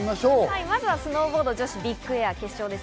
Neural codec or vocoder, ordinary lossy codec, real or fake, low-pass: none; none; real; none